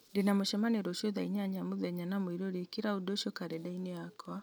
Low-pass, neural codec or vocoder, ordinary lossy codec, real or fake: none; none; none; real